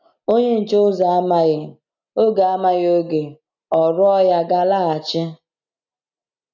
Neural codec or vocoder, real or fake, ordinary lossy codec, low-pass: none; real; none; 7.2 kHz